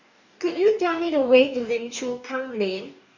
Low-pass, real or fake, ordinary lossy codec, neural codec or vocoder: 7.2 kHz; fake; none; codec, 44.1 kHz, 2.6 kbps, DAC